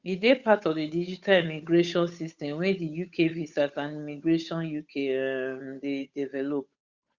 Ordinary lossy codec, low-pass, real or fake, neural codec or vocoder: Opus, 64 kbps; 7.2 kHz; fake; codec, 16 kHz, 8 kbps, FunCodec, trained on Chinese and English, 25 frames a second